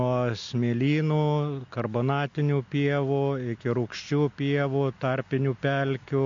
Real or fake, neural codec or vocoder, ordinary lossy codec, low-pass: real; none; MP3, 48 kbps; 7.2 kHz